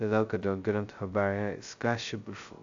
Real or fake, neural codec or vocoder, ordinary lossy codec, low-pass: fake; codec, 16 kHz, 0.2 kbps, FocalCodec; AAC, 64 kbps; 7.2 kHz